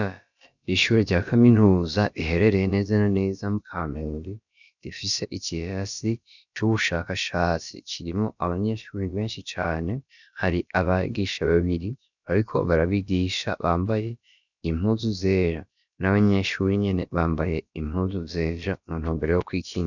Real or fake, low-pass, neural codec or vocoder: fake; 7.2 kHz; codec, 16 kHz, about 1 kbps, DyCAST, with the encoder's durations